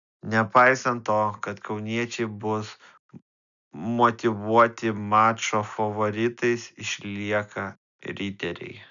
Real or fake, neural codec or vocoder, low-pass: real; none; 7.2 kHz